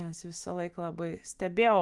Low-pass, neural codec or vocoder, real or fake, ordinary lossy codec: 10.8 kHz; autoencoder, 48 kHz, 128 numbers a frame, DAC-VAE, trained on Japanese speech; fake; Opus, 24 kbps